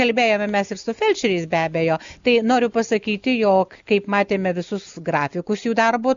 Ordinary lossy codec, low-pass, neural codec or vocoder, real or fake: Opus, 64 kbps; 7.2 kHz; none; real